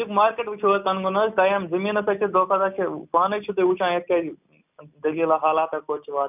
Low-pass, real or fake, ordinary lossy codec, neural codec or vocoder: 3.6 kHz; real; none; none